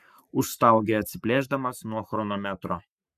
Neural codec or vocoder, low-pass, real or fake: codec, 44.1 kHz, 7.8 kbps, Pupu-Codec; 14.4 kHz; fake